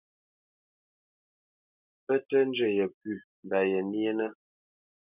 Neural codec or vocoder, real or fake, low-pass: none; real; 3.6 kHz